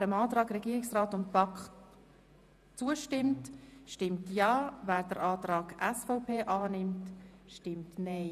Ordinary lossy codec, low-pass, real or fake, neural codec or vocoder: none; 14.4 kHz; fake; vocoder, 48 kHz, 128 mel bands, Vocos